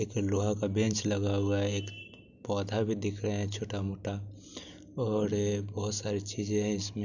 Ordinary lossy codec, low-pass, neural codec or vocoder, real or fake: none; 7.2 kHz; none; real